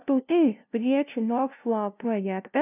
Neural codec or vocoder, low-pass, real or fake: codec, 16 kHz, 0.5 kbps, FunCodec, trained on LibriTTS, 25 frames a second; 3.6 kHz; fake